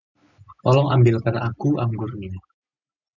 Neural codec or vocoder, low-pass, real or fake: none; 7.2 kHz; real